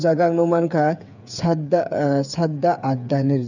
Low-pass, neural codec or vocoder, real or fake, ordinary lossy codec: 7.2 kHz; codec, 16 kHz, 8 kbps, FreqCodec, smaller model; fake; none